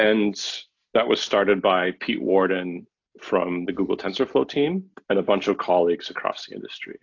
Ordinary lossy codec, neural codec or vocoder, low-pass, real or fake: AAC, 48 kbps; none; 7.2 kHz; real